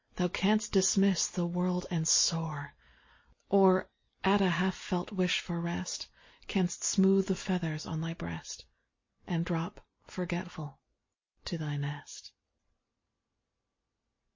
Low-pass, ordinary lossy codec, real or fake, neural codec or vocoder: 7.2 kHz; MP3, 32 kbps; real; none